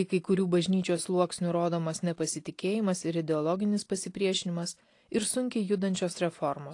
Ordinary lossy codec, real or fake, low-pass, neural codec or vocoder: AAC, 48 kbps; real; 10.8 kHz; none